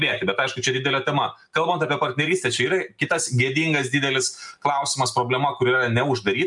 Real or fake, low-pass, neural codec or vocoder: real; 10.8 kHz; none